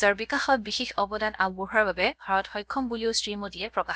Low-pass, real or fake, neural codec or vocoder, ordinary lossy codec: none; fake; codec, 16 kHz, 0.7 kbps, FocalCodec; none